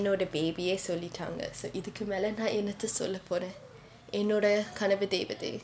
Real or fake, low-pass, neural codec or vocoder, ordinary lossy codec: real; none; none; none